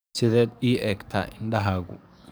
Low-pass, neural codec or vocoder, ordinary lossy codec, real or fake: none; codec, 44.1 kHz, 7.8 kbps, DAC; none; fake